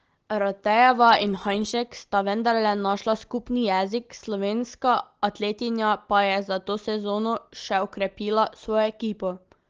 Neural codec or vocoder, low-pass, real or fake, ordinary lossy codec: none; 7.2 kHz; real; Opus, 16 kbps